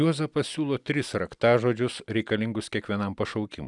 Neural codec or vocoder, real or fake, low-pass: none; real; 10.8 kHz